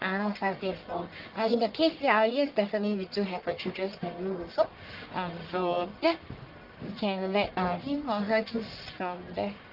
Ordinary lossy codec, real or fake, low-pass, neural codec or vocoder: Opus, 32 kbps; fake; 5.4 kHz; codec, 44.1 kHz, 1.7 kbps, Pupu-Codec